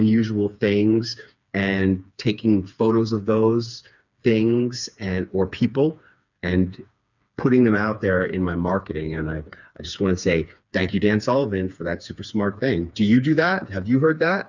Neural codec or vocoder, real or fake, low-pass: codec, 16 kHz, 4 kbps, FreqCodec, smaller model; fake; 7.2 kHz